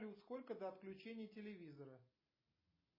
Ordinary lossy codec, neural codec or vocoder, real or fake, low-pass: MP3, 24 kbps; none; real; 5.4 kHz